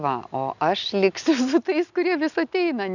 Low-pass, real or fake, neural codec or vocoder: 7.2 kHz; real; none